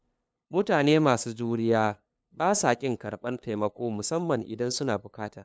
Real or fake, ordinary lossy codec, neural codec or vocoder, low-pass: fake; none; codec, 16 kHz, 2 kbps, FunCodec, trained on LibriTTS, 25 frames a second; none